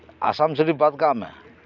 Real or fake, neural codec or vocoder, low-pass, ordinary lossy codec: real; none; 7.2 kHz; none